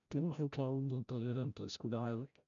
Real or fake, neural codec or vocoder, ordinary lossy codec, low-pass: fake; codec, 16 kHz, 0.5 kbps, FreqCodec, larger model; MP3, 96 kbps; 7.2 kHz